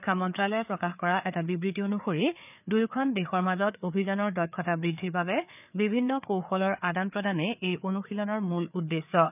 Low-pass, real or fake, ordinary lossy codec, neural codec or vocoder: 3.6 kHz; fake; none; codec, 16 kHz, 4 kbps, FreqCodec, larger model